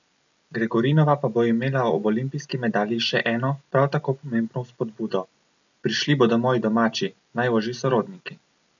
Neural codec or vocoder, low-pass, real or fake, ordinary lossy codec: none; 7.2 kHz; real; none